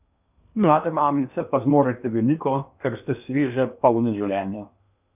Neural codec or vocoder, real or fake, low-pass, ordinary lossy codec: codec, 16 kHz in and 24 kHz out, 0.8 kbps, FocalCodec, streaming, 65536 codes; fake; 3.6 kHz; none